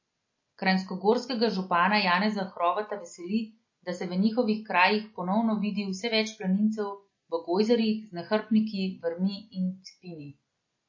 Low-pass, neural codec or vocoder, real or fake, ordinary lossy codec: 7.2 kHz; none; real; MP3, 32 kbps